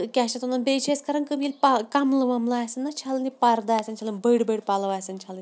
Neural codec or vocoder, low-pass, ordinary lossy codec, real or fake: none; none; none; real